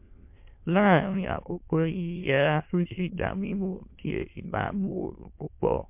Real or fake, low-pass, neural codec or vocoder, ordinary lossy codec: fake; 3.6 kHz; autoencoder, 22.05 kHz, a latent of 192 numbers a frame, VITS, trained on many speakers; MP3, 32 kbps